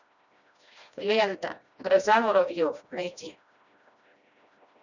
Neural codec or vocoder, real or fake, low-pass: codec, 16 kHz, 1 kbps, FreqCodec, smaller model; fake; 7.2 kHz